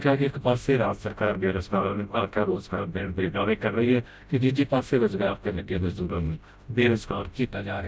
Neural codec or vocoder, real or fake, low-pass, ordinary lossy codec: codec, 16 kHz, 0.5 kbps, FreqCodec, smaller model; fake; none; none